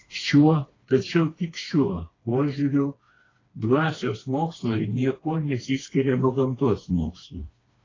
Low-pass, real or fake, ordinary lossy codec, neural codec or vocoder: 7.2 kHz; fake; AAC, 32 kbps; codec, 16 kHz, 2 kbps, FreqCodec, smaller model